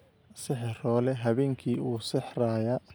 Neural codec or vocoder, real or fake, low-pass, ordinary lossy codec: none; real; none; none